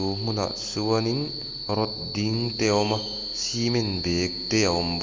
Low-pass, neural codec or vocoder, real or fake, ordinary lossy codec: 7.2 kHz; none; real; Opus, 32 kbps